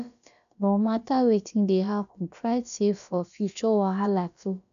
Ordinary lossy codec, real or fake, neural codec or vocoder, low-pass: none; fake; codec, 16 kHz, about 1 kbps, DyCAST, with the encoder's durations; 7.2 kHz